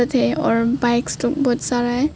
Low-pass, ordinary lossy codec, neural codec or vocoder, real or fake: none; none; none; real